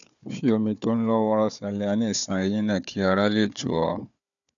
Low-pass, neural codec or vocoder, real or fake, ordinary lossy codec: 7.2 kHz; codec, 16 kHz, 4 kbps, FunCodec, trained on Chinese and English, 50 frames a second; fake; none